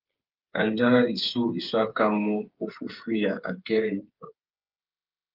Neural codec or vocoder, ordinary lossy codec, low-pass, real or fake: codec, 16 kHz, 8 kbps, FreqCodec, smaller model; Opus, 24 kbps; 5.4 kHz; fake